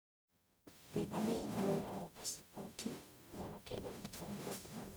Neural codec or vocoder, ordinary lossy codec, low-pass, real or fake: codec, 44.1 kHz, 0.9 kbps, DAC; none; none; fake